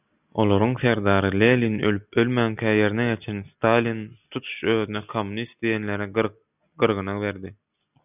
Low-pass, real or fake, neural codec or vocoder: 3.6 kHz; real; none